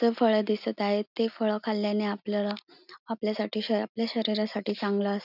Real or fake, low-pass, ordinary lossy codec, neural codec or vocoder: real; 5.4 kHz; none; none